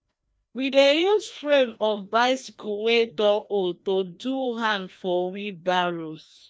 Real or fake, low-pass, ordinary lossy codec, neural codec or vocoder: fake; none; none; codec, 16 kHz, 1 kbps, FreqCodec, larger model